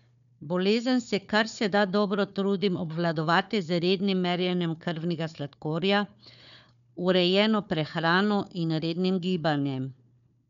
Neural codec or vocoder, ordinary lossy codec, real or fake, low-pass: codec, 16 kHz, 4 kbps, FunCodec, trained on Chinese and English, 50 frames a second; none; fake; 7.2 kHz